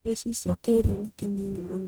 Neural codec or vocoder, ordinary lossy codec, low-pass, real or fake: codec, 44.1 kHz, 0.9 kbps, DAC; none; none; fake